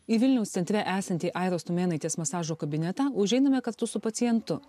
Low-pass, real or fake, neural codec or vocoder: 14.4 kHz; real; none